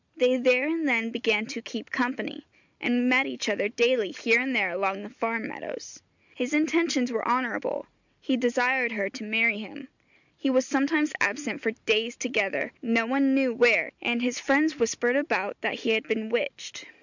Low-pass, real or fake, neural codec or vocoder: 7.2 kHz; real; none